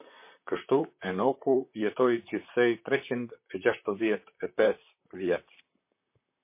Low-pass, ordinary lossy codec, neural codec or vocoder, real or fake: 3.6 kHz; MP3, 24 kbps; vocoder, 44.1 kHz, 128 mel bands, Pupu-Vocoder; fake